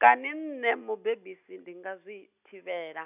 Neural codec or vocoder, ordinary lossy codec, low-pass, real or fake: none; none; 3.6 kHz; real